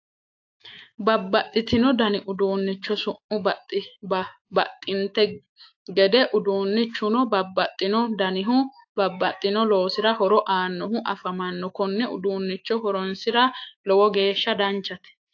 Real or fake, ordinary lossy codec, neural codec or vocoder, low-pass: fake; AAC, 48 kbps; autoencoder, 48 kHz, 128 numbers a frame, DAC-VAE, trained on Japanese speech; 7.2 kHz